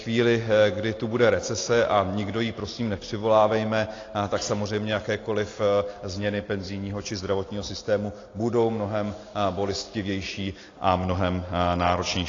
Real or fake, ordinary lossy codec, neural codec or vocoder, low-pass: real; AAC, 32 kbps; none; 7.2 kHz